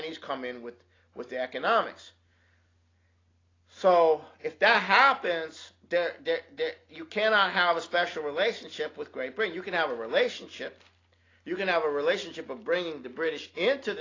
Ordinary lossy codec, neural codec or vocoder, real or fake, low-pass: AAC, 32 kbps; none; real; 7.2 kHz